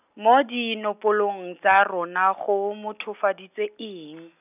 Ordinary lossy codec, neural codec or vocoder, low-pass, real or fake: none; none; 3.6 kHz; real